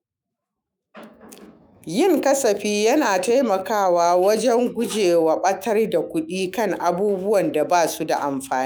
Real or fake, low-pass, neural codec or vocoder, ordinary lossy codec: fake; none; autoencoder, 48 kHz, 128 numbers a frame, DAC-VAE, trained on Japanese speech; none